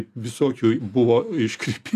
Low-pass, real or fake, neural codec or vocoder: 14.4 kHz; real; none